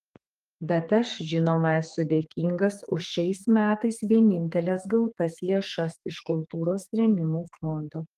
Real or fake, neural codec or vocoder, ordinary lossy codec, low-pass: fake; codec, 32 kHz, 1.9 kbps, SNAC; Opus, 24 kbps; 14.4 kHz